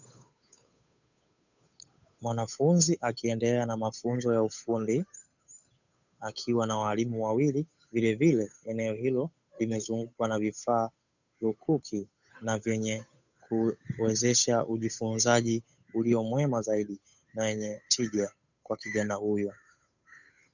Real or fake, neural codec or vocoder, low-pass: fake; codec, 16 kHz, 8 kbps, FunCodec, trained on Chinese and English, 25 frames a second; 7.2 kHz